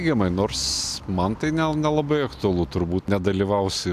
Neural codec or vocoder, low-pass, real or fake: none; 14.4 kHz; real